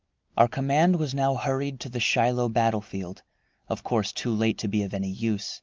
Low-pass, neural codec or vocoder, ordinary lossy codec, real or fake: 7.2 kHz; none; Opus, 32 kbps; real